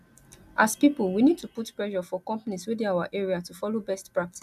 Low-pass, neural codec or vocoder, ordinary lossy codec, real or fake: 14.4 kHz; none; none; real